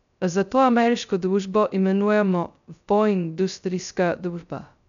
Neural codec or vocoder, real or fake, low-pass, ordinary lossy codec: codec, 16 kHz, 0.2 kbps, FocalCodec; fake; 7.2 kHz; none